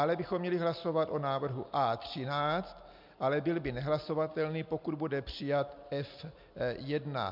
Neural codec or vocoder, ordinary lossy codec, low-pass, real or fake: none; MP3, 48 kbps; 5.4 kHz; real